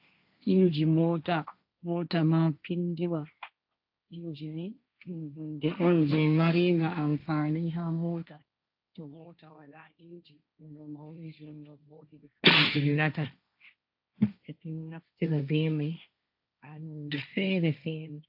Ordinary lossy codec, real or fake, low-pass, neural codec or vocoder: AAC, 48 kbps; fake; 5.4 kHz; codec, 16 kHz, 1.1 kbps, Voila-Tokenizer